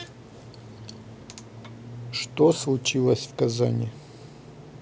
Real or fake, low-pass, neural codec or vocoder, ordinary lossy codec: real; none; none; none